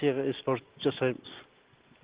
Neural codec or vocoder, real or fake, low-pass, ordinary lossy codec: none; real; 3.6 kHz; Opus, 16 kbps